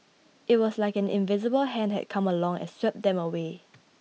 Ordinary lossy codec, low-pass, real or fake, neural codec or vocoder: none; none; real; none